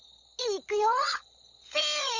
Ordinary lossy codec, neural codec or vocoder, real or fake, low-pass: none; codec, 16 kHz, 16 kbps, FunCodec, trained on LibriTTS, 50 frames a second; fake; 7.2 kHz